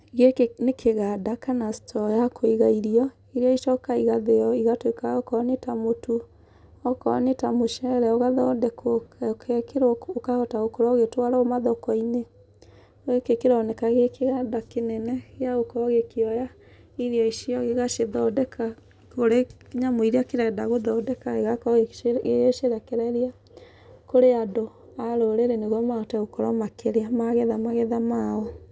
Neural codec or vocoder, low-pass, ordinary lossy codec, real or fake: none; none; none; real